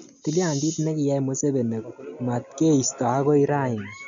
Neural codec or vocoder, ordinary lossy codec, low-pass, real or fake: none; none; 7.2 kHz; real